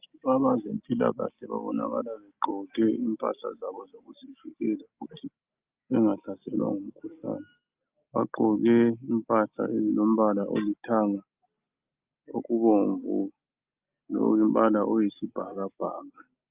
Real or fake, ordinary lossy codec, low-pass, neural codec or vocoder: real; Opus, 24 kbps; 3.6 kHz; none